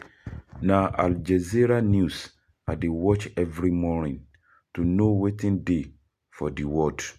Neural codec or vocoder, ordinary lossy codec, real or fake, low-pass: none; none; real; 14.4 kHz